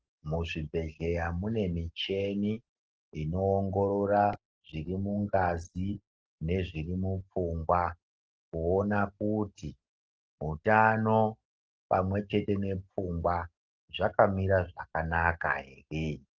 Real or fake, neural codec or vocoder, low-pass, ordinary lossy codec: real; none; 7.2 kHz; Opus, 16 kbps